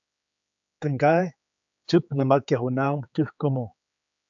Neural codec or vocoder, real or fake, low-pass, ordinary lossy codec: codec, 16 kHz, 4 kbps, X-Codec, HuBERT features, trained on general audio; fake; 7.2 kHz; AAC, 64 kbps